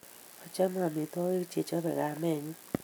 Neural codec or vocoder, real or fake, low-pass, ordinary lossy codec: none; real; none; none